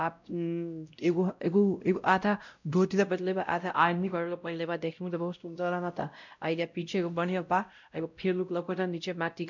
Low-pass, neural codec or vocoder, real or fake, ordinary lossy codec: 7.2 kHz; codec, 16 kHz, 0.5 kbps, X-Codec, WavLM features, trained on Multilingual LibriSpeech; fake; none